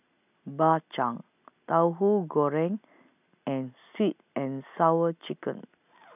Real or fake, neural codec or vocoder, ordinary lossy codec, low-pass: real; none; none; 3.6 kHz